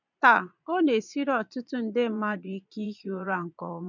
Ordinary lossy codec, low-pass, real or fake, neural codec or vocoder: none; 7.2 kHz; fake; vocoder, 22.05 kHz, 80 mel bands, Vocos